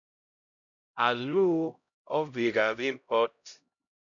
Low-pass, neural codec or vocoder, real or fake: 7.2 kHz; codec, 16 kHz, 0.5 kbps, X-Codec, HuBERT features, trained on LibriSpeech; fake